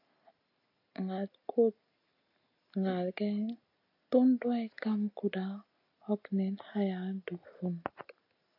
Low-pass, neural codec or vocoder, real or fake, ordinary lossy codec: 5.4 kHz; none; real; AAC, 32 kbps